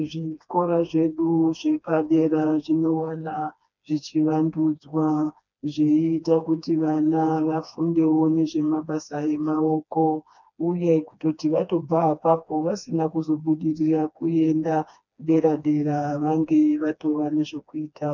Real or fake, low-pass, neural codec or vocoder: fake; 7.2 kHz; codec, 16 kHz, 2 kbps, FreqCodec, smaller model